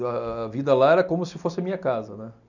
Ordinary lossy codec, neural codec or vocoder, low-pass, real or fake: none; none; 7.2 kHz; real